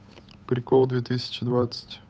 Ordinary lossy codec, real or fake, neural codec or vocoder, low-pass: none; fake; codec, 16 kHz, 8 kbps, FunCodec, trained on Chinese and English, 25 frames a second; none